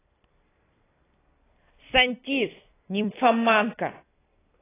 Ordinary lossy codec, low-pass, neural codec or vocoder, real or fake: AAC, 16 kbps; 3.6 kHz; vocoder, 44.1 kHz, 128 mel bands every 256 samples, BigVGAN v2; fake